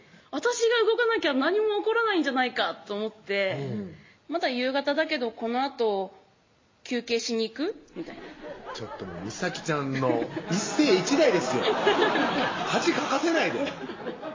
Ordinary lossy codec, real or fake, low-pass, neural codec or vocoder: MP3, 32 kbps; real; 7.2 kHz; none